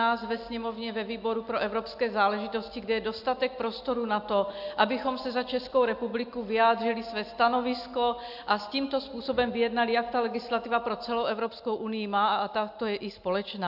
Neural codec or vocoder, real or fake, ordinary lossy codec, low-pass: none; real; AAC, 48 kbps; 5.4 kHz